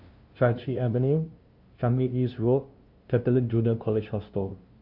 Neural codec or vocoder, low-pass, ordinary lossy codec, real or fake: codec, 16 kHz, 0.5 kbps, FunCodec, trained on LibriTTS, 25 frames a second; 5.4 kHz; Opus, 24 kbps; fake